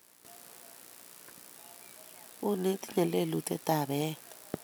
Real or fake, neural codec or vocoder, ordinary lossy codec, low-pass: real; none; none; none